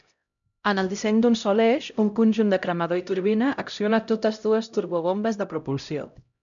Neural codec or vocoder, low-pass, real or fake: codec, 16 kHz, 0.5 kbps, X-Codec, HuBERT features, trained on LibriSpeech; 7.2 kHz; fake